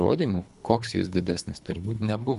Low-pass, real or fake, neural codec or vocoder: 10.8 kHz; fake; codec, 24 kHz, 3 kbps, HILCodec